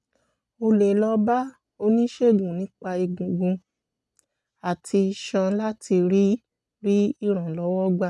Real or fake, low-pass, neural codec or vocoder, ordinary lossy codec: real; none; none; none